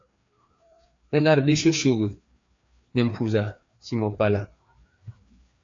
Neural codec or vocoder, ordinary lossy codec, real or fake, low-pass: codec, 16 kHz, 2 kbps, FreqCodec, larger model; AAC, 48 kbps; fake; 7.2 kHz